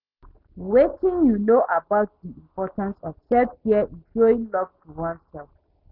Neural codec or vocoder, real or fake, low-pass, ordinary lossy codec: none; real; 5.4 kHz; none